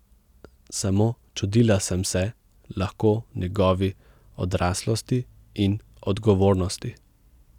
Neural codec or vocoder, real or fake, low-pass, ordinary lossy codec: none; real; 19.8 kHz; none